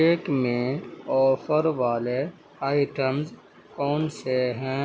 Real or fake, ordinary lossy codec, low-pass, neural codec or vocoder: real; none; none; none